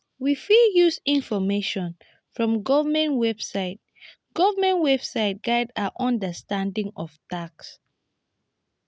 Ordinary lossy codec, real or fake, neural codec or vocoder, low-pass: none; real; none; none